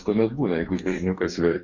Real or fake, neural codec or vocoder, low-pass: fake; codec, 44.1 kHz, 2.6 kbps, DAC; 7.2 kHz